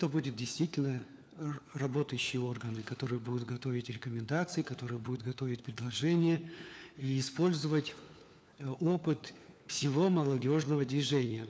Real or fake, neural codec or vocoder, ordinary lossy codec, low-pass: fake; codec, 16 kHz, 4 kbps, FunCodec, trained on LibriTTS, 50 frames a second; none; none